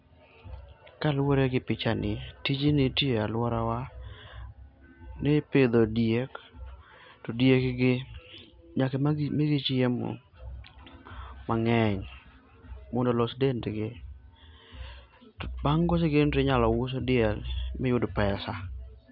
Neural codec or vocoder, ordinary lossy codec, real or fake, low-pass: none; none; real; 5.4 kHz